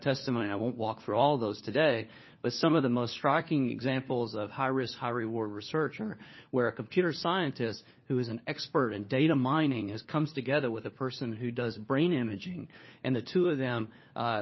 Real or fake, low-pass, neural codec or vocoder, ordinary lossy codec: fake; 7.2 kHz; codec, 16 kHz, 4 kbps, FunCodec, trained on LibriTTS, 50 frames a second; MP3, 24 kbps